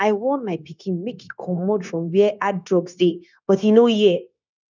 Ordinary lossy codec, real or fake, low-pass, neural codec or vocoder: none; fake; 7.2 kHz; codec, 16 kHz, 0.9 kbps, LongCat-Audio-Codec